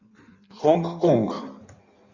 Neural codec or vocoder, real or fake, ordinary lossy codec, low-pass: codec, 16 kHz in and 24 kHz out, 1.1 kbps, FireRedTTS-2 codec; fake; Opus, 64 kbps; 7.2 kHz